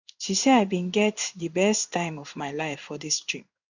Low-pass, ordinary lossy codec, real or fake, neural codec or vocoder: 7.2 kHz; none; fake; codec, 16 kHz in and 24 kHz out, 1 kbps, XY-Tokenizer